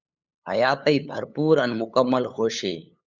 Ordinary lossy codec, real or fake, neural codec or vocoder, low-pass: Opus, 64 kbps; fake; codec, 16 kHz, 8 kbps, FunCodec, trained on LibriTTS, 25 frames a second; 7.2 kHz